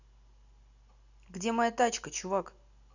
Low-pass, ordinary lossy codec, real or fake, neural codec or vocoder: 7.2 kHz; none; real; none